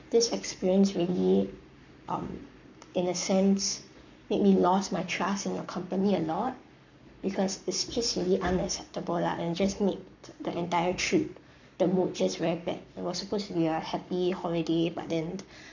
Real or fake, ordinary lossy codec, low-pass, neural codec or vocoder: fake; none; 7.2 kHz; codec, 44.1 kHz, 7.8 kbps, Pupu-Codec